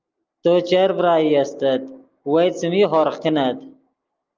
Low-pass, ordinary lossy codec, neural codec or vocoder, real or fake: 7.2 kHz; Opus, 24 kbps; none; real